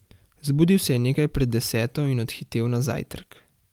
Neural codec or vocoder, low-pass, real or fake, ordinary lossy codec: vocoder, 44.1 kHz, 128 mel bands every 512 samples, BigVGAN v2; 19.8 kHz; fake; Opus, 32 kbps